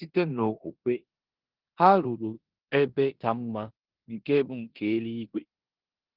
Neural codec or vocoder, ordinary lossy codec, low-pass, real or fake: codec, 16 kHz in and 24 kHz out, 0.9 kbps, LongCat-Audio-Codec, four codebook decoder; Opus, 16 kbps; 5.4 kHz; fake